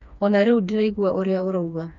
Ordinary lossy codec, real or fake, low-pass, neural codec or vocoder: none; fake; 7.2 kHz; codec, 16 kHz, 2 kbps, FreqCodec, smaller model